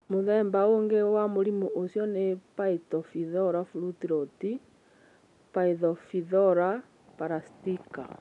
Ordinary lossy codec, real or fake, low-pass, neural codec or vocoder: none; real; 10.8 kHz; none